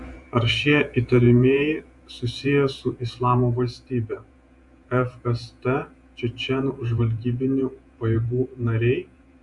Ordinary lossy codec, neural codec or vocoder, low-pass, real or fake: AAC, 64 kbps; vocoder, 48 kHz, 128 mel bands, Vocos; 10.8 kHz; fake